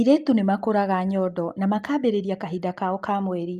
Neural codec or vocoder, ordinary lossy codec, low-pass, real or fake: none; Opus, 32 kbps; 14.4 kHz; real